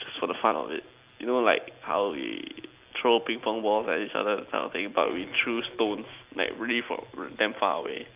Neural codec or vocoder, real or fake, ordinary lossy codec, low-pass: none; real; Opus, 24 kbps; 3.6 kHz